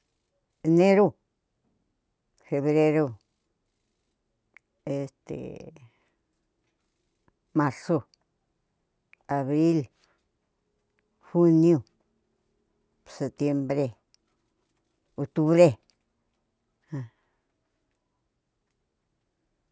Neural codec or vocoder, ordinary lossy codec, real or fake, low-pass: none; none; real; none